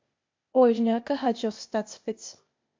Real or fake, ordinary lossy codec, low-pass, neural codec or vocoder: fake; MP3, 48 kbps; 7.2 kHz; codec, 16 kHz, 0.8 kbps, ZipCodec